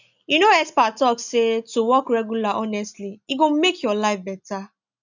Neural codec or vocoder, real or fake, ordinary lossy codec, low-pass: none; real; none; 7.2 kHz